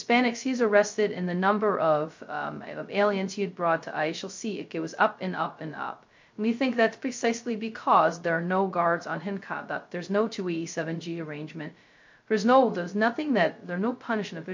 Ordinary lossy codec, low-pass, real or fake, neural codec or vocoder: MP3, 48 kbps; 7.2 kHz; fake; codec, 16 kHz, 0.2 kbps, FocalCodec